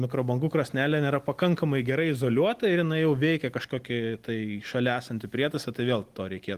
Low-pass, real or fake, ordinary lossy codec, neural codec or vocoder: 14.4 kHz; real; Opus, 24 kbps; none